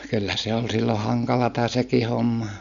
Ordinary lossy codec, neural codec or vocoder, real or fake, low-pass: none; none; real; 7.2 kHz